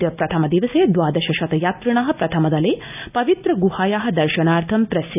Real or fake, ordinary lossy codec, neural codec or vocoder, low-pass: real; none; none; 3.6 kHz